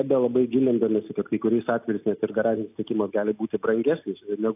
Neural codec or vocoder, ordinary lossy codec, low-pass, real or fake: none; AAC, 32 kbps; 3.6 kHz; real